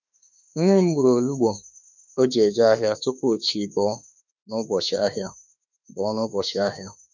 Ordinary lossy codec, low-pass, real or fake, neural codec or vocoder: none; 7.2 kHz; fake; autoencoder, 48 kHz, 32 numbers a frame, DAC-VAE, trained on Japanese speech